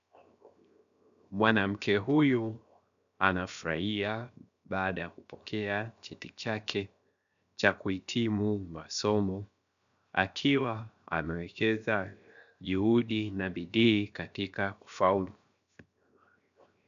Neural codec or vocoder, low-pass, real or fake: codec, 16 kHz, 0.7 kbps, FocalCodec; 7.2 kHz; fake